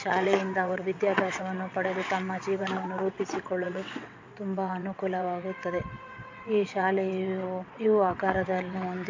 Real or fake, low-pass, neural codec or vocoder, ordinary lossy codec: fake; 7.2 kHz; vocoder, 22.05 kHz, 80 mel bands, WaveNeXt; MP3, 64 kbps